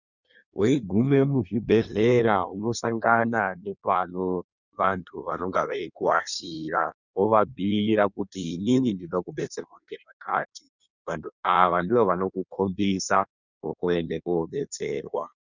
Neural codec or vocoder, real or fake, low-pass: codec, 16 kHz in and 24 kHz out, 1.1 kbps, FireRedTTS-2 codec; fake; 7.2 kHz